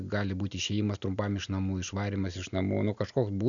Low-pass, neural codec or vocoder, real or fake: 7.2 kHz; none; real